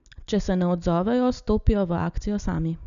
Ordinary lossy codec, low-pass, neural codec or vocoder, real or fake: none; 7.2 kHz; none; real